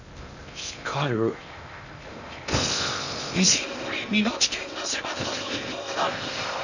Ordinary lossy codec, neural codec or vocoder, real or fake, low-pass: none; codec, 16 kHz in and 24 kHz out, 0.6 kbps, FocalCodec, streaming, 2048 codes; fake; 7.2 kHz